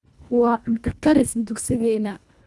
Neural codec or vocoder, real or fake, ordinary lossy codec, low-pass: codec, 24 kHz, 1.5 kbps, HILCodec; fake; none; none